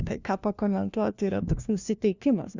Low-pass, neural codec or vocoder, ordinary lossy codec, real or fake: 7.2 kHz; codec, 16 kHz, 1 kbps, FunCodec, trained on LibriTTS, 50 frames a second; Opus, 64 kbps; fake